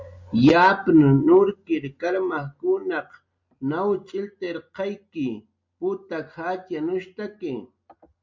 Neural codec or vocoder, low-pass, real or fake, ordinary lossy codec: none; 7.2 kHz; real; MP3, 48 kbps